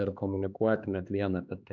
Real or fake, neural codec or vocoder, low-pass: fake; codec, 16 kHz, 4 kbps, X-Codec, HuBERT features, trained on LibriSpeech; 7.2 kHz